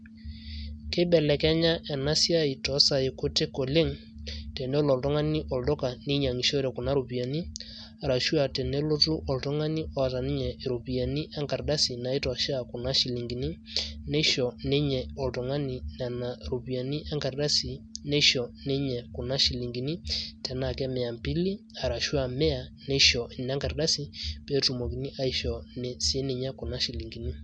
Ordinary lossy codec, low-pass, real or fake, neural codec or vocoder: none; 9.9 kHz; real; none